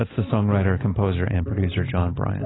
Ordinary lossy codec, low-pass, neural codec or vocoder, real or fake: AAC, 16 kbps; 7.2 kHz; none; real